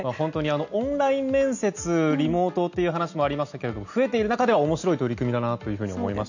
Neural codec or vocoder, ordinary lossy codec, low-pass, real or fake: none; none; 7.2 kHz; real